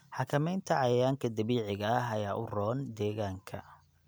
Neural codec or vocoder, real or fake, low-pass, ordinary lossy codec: none; real; none; none